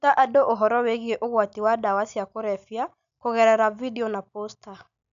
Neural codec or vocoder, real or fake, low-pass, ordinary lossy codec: none; real; 7.2 kHz; MP3, 96 kbps